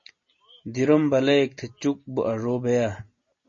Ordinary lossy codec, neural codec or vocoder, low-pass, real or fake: MP3, 32 kbps; none; 7.2 kHz; real